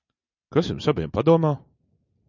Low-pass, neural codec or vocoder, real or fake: 7.2 kHz; none; real